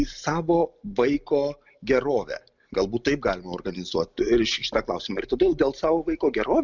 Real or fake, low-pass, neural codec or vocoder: real; 7.2 kHz; none